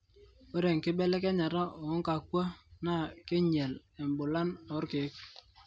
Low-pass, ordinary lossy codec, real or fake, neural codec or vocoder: none; none; real; none